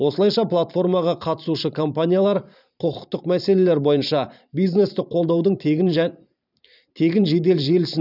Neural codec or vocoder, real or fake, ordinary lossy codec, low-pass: vocoder, 44.1 kHz, 128 mel bands every 512 samples, BigVGAN v2; fake; none; 5.4 kHz